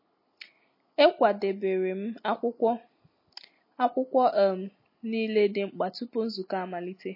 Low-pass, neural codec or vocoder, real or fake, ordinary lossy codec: 5.4 kHz; none; real; MP3, 24 kbps